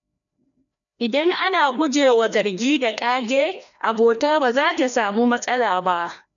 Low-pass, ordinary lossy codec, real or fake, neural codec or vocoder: 7.2 kHz; none; fake; codec, 16 kHz, 1 kbps, FreqCodec, larger model